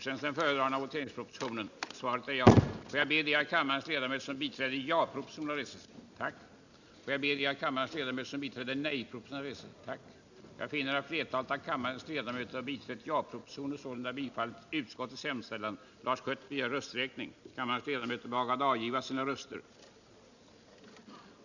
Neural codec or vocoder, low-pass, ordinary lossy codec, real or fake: none; 7.2 kHz; none; real